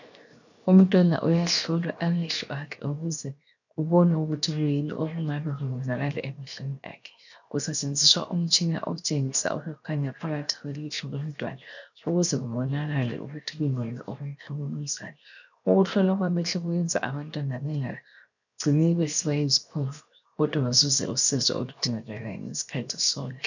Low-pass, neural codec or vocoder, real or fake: 7.2 kHz; codec, 16 kHz, 0.7 kbps, FocalCodec; fake